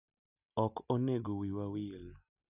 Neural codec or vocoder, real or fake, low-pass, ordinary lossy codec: none; real; 3.6 kHz; none